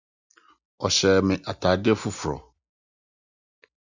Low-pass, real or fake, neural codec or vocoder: 7.2 kHz; real; none